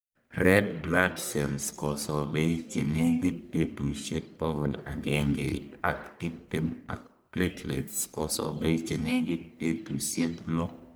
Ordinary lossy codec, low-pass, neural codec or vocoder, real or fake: none; none; codec, 44.1 kHz, 1.7 kbps, Pupu-Codec; fake